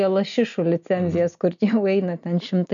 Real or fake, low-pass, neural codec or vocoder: real; 7.2 kHz; none